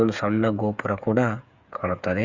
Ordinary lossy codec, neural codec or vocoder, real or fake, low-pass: Opus, 64 kbps; codec, 16 kHz, 8 kbps, FreqCodec, smaller model; fake; 7.2 kHz